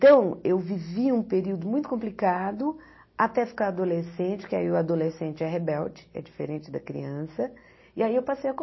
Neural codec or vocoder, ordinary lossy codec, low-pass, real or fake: none; MP3, 24 kbps; 7.2 kHz; real